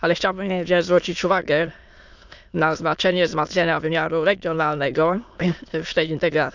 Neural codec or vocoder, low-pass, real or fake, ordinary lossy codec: autoencoder, 22.05 kHz, a latent of 192 numbers a frame, VITS, trained on many speakers; 7.2 kHz; fake; none